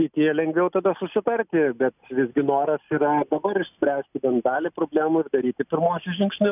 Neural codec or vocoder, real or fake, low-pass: none; real; 3.6 kHz